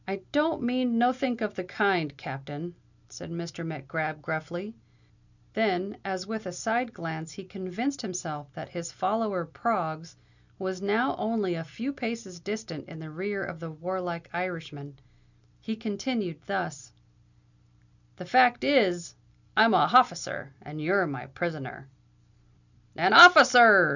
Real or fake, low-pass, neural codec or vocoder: real; 7.2 kHz; none